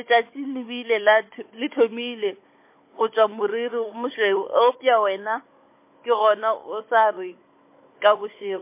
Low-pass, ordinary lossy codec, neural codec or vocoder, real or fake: 3.6 kHz; MP3, 24 kbps; codec, 16 kHz, 16 kbps, FunCodec, trained on Chinese and English, 50 frames a second; fake